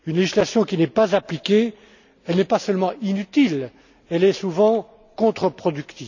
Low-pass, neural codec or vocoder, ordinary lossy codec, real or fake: 7.2 kHz; none; none; real